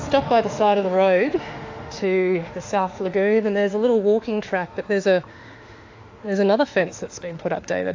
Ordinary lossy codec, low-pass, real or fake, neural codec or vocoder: AAC, 48 kbps; 7.2 kHz; fake; autoencoder, 48 kHz, 32 numbers a frame, DAC-VAE, trained on Japanese speech